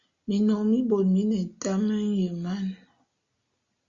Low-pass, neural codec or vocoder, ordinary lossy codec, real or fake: 7.2 kHz; none; Opus, 64 kbps; real